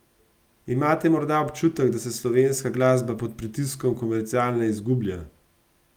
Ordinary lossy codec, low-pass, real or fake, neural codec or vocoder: Opus, 32 kbps; 19.8 kHz; real; none